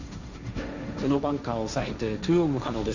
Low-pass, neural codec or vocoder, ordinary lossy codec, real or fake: 7.2 kHz; codec, 16 kHz, 1.1 kbps, Voila-Tokenizer; none; fake